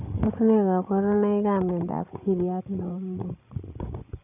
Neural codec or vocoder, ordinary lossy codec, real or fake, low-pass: codec, 16 kHz, 16 kbps, FreqCodec, larger model; none; fake; 3.6 kHz